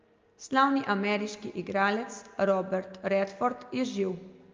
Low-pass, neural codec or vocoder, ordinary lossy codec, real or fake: 7.2 kHz; none; Opus, 24 kbps; real